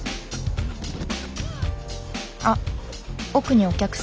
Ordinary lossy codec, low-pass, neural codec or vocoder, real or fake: none; none; none; real